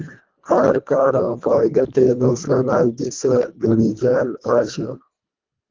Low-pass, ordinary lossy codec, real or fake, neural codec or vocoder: 7.2 kHz; Opus, 24 kbps; fake; codec, 24 kHz, 1.5 kbps, HILCodec